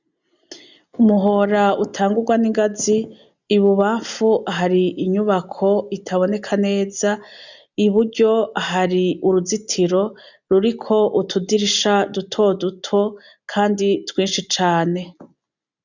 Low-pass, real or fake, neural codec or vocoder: 7.2 kHz; real; none